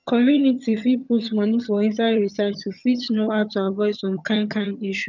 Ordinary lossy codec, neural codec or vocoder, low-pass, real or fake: none; vocoder, 22.05 kHz, 80 mel bands, HiFi-GAN; 7.2 kHz; fake